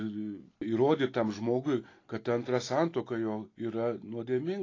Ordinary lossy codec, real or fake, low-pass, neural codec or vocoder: AAC, 32 kbps; real; 7.2 kHz; none